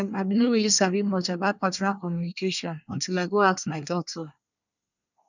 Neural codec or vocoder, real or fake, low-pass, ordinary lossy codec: codec, 24 kHz, 1 kbps, SNAC; fake; 7.2 kHz; none